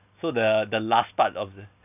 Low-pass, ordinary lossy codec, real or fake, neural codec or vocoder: 3.6 kHz; none; real; none